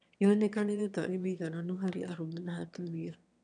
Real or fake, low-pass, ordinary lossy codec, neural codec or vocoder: fake; 9.9 kHz; none; autoencoder, 22.05 kHz, a latent of 192 numbers a frame, VITS, trained on one speaker